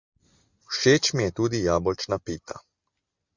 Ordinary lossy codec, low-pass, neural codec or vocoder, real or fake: Opus, 64 kbps; 7.2 kHz; none; real